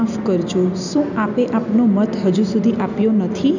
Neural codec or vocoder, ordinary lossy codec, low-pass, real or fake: none; none; 7.2 kHz; real